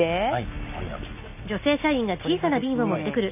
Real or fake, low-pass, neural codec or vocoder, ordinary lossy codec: real; 3.6 kHz; none; none